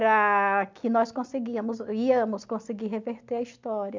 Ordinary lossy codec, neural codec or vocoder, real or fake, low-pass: MP3, 64 kbps; none; real; 7.2 kHz